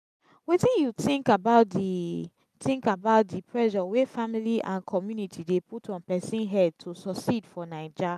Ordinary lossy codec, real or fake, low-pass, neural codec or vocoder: none; real; 14.4 kHz; none